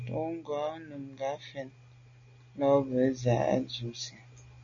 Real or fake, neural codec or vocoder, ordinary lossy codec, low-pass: real; none; MP3, 48 kbps; 7.2 kHz